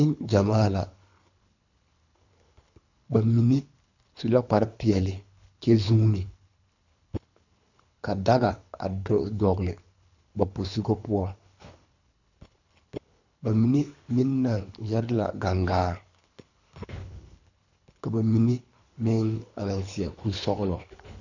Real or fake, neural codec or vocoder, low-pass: fake; codec, 24 kHz, 3 kbps, HILCodec; 7.2 kHz